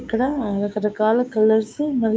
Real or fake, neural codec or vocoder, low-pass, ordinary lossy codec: fake; codec, 16 kHz, 6 kbps, DAC; none; none